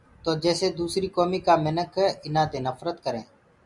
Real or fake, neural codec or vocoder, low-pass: real; none; 10.8 kHz